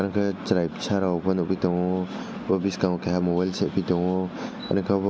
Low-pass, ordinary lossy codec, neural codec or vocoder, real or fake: none; none; none; real